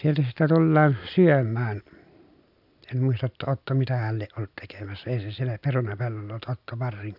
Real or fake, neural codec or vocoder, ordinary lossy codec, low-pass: real; none; none; 5.4 kHz